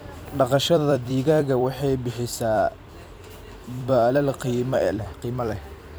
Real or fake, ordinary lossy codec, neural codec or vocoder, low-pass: fake; none; vocoder, 44.1 kHz, 128 mel bands every 256 samples, BigVGAN v2; none